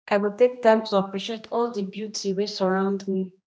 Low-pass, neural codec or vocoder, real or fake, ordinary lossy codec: none; codec, 16 kHz, 1 kbps, X-Codec, HuBERT features, trained on general audio; fake; none